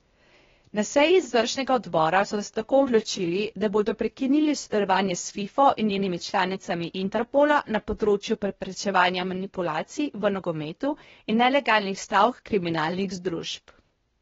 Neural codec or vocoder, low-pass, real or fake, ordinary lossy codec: codec, 16 kHz, 0.8 kbps, ZipCodec; 7.2 kHz; fake; AAC, 24 kbps